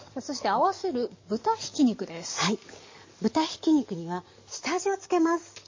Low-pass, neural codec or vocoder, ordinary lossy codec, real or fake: 7.2 kHz; codec, 44.1 kHz, 7.8 kbps, DAC; MP3, 32 kbps; fake